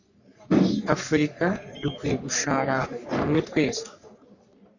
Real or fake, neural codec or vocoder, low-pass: fake; codec, 44.1 kHz, 3.4 kbps, Pupu-Codec; 7.2 kHz